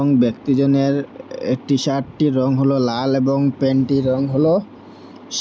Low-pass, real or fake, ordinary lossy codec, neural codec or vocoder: none; real; none; none